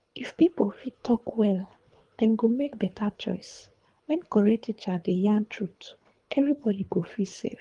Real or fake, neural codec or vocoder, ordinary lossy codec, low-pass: fake; codec, 24 kHz, 3 kbps, HILCodec; Opus, 32 kbps; 10.8 kHz